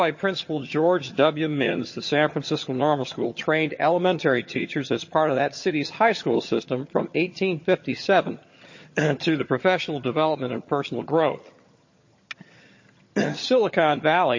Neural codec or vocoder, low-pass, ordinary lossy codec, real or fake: vocoder, 22.05 kHz, 80 mel bands, HiFi-GAN; 7.2 kHz; MP3, 32 kbps; fake